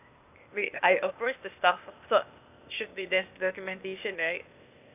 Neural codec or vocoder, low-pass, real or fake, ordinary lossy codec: codec, 16 kHz, 0.8 kbps, ZipCodec; 3.6 kHz; fake; none